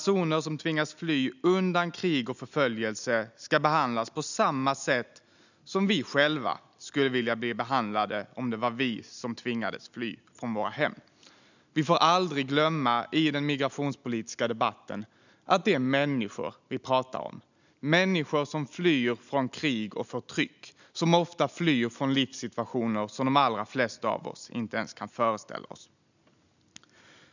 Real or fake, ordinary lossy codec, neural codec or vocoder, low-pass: real; none; none; 7.2 kHz